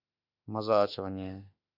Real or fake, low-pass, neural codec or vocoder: fake; 5.4 kHz; autoencoder, 48 kHz, 32 numbers a frame, DAC-VAE, trained on Japanese speech